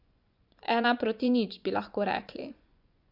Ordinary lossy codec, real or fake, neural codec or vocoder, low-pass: Opus, 64 kbps; fake; vocoder, 24 kHz, 100 mel bands, Vocos; 5.4 kHz